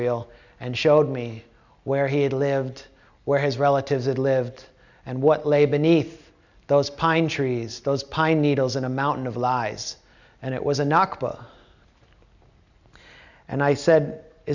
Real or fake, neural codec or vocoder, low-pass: real; none; 7.2 kHz